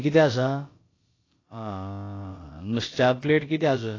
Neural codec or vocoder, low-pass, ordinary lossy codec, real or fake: codec, 16 kHz, about 1 kbps, DyCAST, with the encoder's durations; 7.2 kHz; AAC, 32 kbps; fake